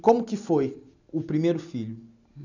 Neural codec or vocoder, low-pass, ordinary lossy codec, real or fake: none; 7.2 kHz; none; real